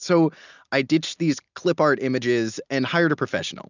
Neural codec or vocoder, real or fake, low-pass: none; real; 7.2 kHz